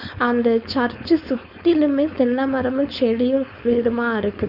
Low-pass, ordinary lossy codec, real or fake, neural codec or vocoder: 5.4 kHz; none; fake; codec, 16 kHz, 4.8 kbps, FACodec